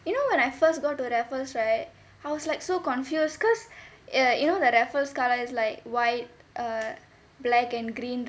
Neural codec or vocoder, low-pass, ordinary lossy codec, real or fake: none; none; none; real